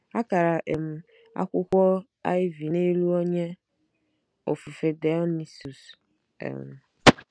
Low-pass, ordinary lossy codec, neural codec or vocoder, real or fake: 9.9 kHz; none; none; real